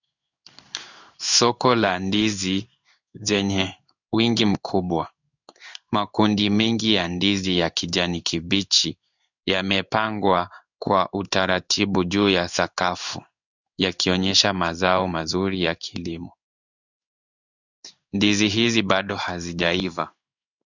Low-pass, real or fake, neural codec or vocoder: 7.2 kHz; fake; codec, 16 kHz in and 24 kHz out, 1 kbps, XY-Tokenizer